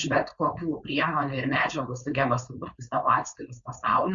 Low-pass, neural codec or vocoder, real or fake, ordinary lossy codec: 7.2 kHz; codec, 16 kHz, 4.8 kbps, FACodec; fake; Opus, 64 kbps